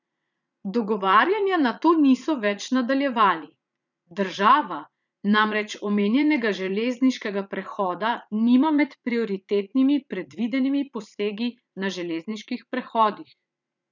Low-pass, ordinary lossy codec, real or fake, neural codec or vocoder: 7.2 kHz; none; fake; vocoder, 44.1 kHz, 80 mel bands, Vocos